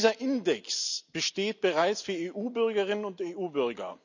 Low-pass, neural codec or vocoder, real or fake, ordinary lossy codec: 7.2 kHz; none; real; none